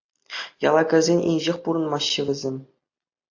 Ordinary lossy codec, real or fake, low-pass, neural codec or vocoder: AAC, 48 kbps; real; 7.2 kHz; none